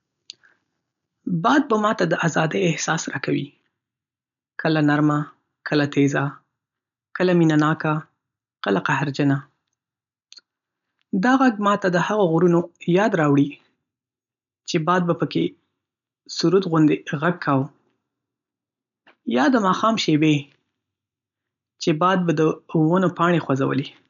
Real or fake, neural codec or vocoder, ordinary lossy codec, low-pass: real; none; none; 7.2 kHz